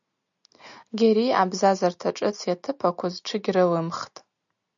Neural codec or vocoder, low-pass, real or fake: none; 7.2 kHz; real